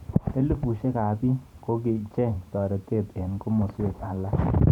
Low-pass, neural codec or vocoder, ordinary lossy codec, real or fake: 19.8 kHz; vocoder, 44.1 kHz, 128 mel bands every 512 samples, BigVGAN v2; none; fake